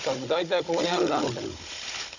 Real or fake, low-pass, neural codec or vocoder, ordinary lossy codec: fake; 7.2 kHz; codec, 16 kHz, 16 kbps, FunCodec, trained on Chinese and English, 50 frames a second; none